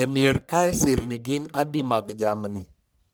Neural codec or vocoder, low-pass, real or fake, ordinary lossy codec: codec, 44.1 kHz, 1.7 kbps, Pupu-Codec; none; fake; none